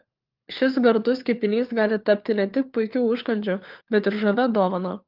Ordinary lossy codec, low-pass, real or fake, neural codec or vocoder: Opus, 32 kbps; 5.4 kHz; fake; codec, 16 kHz, 4 kbps, FunCodec, trained on LibriTTS, 50 frames a second